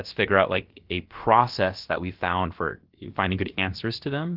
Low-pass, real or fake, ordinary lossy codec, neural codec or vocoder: 5.4 kHz; fake; Opus, 16 kbps; codec, 16 kHz, about 1 kbps, DyCAST, with the encoder's durations